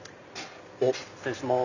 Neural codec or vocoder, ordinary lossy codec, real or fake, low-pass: none; none; real; 7.2 kHz